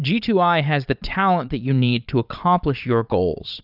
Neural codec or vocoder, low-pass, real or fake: vocoder, 22.05 kHz, 80 mel bands, Vocos; 5.4 kHz; fake